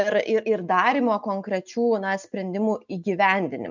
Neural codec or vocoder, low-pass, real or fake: none; 7.2 kHz; real